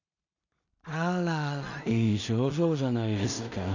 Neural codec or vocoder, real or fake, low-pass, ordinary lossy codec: codec, 16 kHz in and 24 kHz out, 0.4 kbps, LongCat-Audio-Codec, two codebook decoder; fake; 7.2 kHz; none